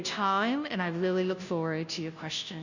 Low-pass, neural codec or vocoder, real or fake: 7.2 kHz; codec, 16 kHz, 0.5 kbps, FunCodec, trained on Chinese and English, 25 frames a second; fake